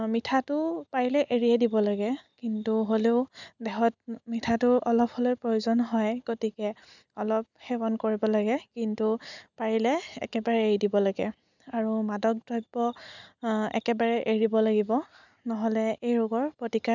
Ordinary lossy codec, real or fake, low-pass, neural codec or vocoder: none; real; 7.2 kHz; none